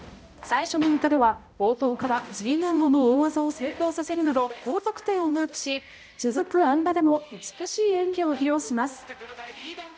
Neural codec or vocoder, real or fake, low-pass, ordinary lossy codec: codec, 16 kHz, 0.5 kbps, X-Codec, HuBERT features, trained on balanced general audio; fake; none; none